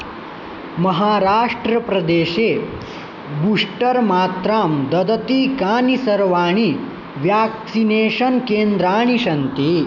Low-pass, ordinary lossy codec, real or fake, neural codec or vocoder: 7.2 kHz; none; real; none